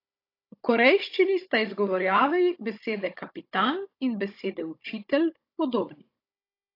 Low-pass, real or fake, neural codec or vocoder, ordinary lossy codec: 5.4 kHz; fake; codec, 16 kHz, 16 kbps, FunCodec, trained on Chinese and English, 50 frames a second; AAC, 32 kbps